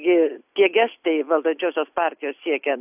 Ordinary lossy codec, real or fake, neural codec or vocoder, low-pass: AAC, 32 kbps; real; none; 3.6 kHz